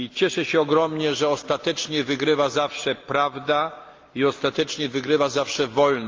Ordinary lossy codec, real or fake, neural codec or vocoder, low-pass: Opus, 24 kbps; real; none; 7.2 kHz